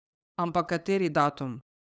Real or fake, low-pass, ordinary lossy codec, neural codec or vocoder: fake; none; none; codec, 16 kHz, 8 kbps, FunCodec, trained on LibriTTS, 25 frames a second